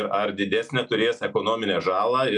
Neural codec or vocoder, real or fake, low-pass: none; real; 10.8 kHz